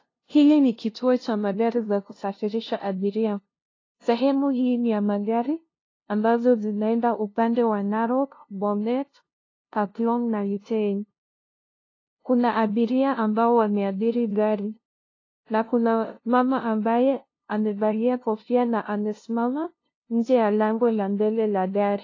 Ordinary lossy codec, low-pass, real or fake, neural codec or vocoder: AAC, 32 kbps; 7.2 kHz; fake; codec, 16 kHz, 0.5 kbps, FunCodec, trained on LibriTTS, 25 frames a second